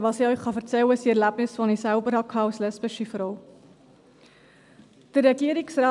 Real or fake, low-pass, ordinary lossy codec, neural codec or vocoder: real; 10.8 kHz; none; none